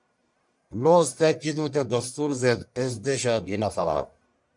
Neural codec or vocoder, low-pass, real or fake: codec, 44.1 kHz, 1.7 kbps, Pupu-Codec; 10.8 kHz; fake